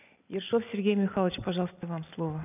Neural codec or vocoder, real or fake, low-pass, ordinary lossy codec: none; real; 3.6 kHz; none